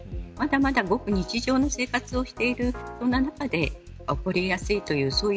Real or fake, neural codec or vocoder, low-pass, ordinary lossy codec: real; none; none; none